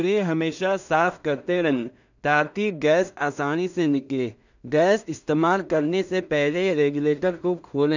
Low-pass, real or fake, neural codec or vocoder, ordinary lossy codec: 7.2 kHz; fake; codec, 16 kHz in and 24 kHz out, 0.4 kbps, LongCat-Audio-Codec, two codebook decoder; none